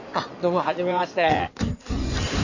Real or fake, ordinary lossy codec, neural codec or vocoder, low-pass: fake; none; codec, 16 kHz in and 24 kHz out, 2.2 kbps, FireRedTTS-2 codec; 7.2 kHz